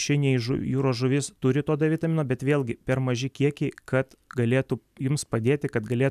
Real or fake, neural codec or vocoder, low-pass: real; none; 14.4 kHz